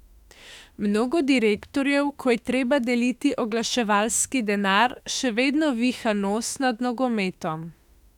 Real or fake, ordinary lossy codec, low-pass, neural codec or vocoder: fake; none; 19.8 kHz; autoencoder, 48 kHz, 32 numbers a frame, DAC-VAE, trained on Japanese speech